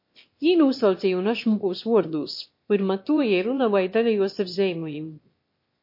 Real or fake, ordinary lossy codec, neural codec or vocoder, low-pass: fake; MP3, 32 kbps; autoencoder, 22.05 kHz, a latent of 192 numbers a frame, VITS, trained on one speaker; 5.4 kHz